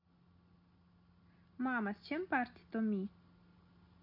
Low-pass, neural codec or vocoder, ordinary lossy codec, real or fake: 5.4 kHz; none; MP3, 48 kbps; real